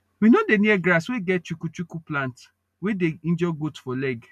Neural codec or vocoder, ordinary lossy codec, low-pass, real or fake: none; none; 14.4 kHz; real